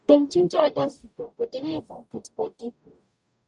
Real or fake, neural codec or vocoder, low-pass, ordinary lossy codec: fake; codec, 44.1 kHz, 0.9 kbps, DAC; 10.8 kHz; none